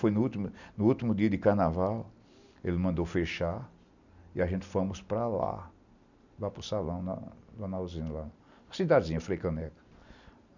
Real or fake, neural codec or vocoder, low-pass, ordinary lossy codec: real; none; 7.2 kHz; none